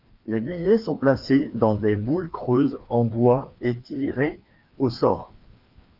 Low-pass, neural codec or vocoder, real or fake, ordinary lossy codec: 5.4 kHz; codec, 16 kHz, 2 kbps, FreqCodec, larger model; fake; Opus, 24 kbps